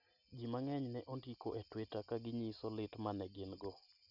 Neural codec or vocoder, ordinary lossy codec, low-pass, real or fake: none; none; 5.4 kHz; real